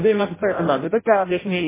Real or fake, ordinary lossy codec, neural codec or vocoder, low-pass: fake; MP3, 16 kbps; codec, 16 kHz in and 24 kHz out, 0.6 kbps, FireRedTTS-2 codec; 3.6 kHz